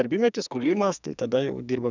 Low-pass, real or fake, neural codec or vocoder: 7.2 kHz; fake; codec, 44.1 kHz, 2.6 kbps, SNAC